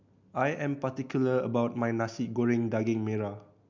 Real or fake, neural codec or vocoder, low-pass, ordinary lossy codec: real; none; 7.2 kHz; MP3, 64 kbps